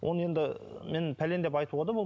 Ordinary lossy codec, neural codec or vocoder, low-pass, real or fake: none; none; none; real